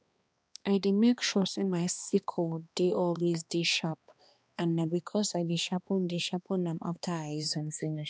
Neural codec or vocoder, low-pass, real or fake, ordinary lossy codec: codec, 16 kHz, 2 kbps, X-Codec, HuBERT features, trained on balanced general audio; none; fake; none